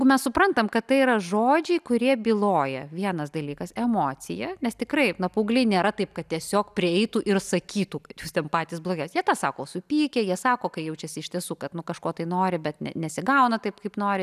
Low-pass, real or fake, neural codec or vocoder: 14.4 kHz; real; none